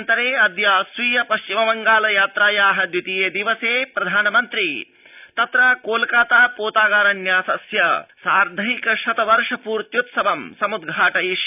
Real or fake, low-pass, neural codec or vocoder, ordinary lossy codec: real; 3.6 kHz; none; none